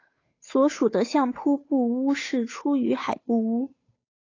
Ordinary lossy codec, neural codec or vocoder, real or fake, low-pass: AAC, 32 kbps; codec, 16 kHz, 8 kbps, FunCodec, trained on Chinese and English, 25 frames a second; fake; 7.2 kHz